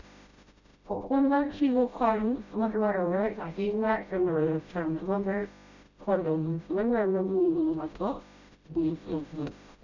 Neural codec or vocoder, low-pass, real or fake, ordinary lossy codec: codec, 16 kHz, 0.5 kbps, FreqCodec, smaller model; 7.2 kHz; fake; none